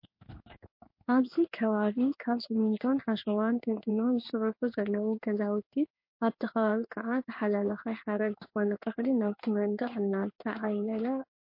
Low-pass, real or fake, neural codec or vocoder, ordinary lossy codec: 5.4 kHz; fake; codec, 16 kHz in and 24 kHz out, 1 kbps, XY-Tokenizer; MP3, 32 kbps